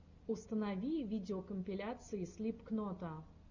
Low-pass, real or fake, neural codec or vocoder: 7.2 kHz; real; none